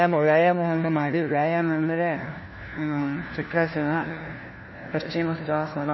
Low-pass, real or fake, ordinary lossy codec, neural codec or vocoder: 7.2 kHz; fake; MP3, 24 kbps; codec, 16 kHz, 1 kbps, FunCodec, trained on LibriTTS, 50 frames a second